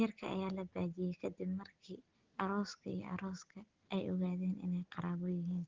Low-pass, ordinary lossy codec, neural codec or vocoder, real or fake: 7.2 kHz; Opus, 16 kbps; none; real